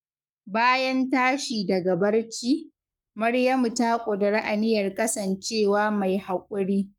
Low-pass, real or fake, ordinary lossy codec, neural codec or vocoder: 19.8 kHz; fake; none; codec, 44.1 kHz, 7.8 kbps, Pupu-Codec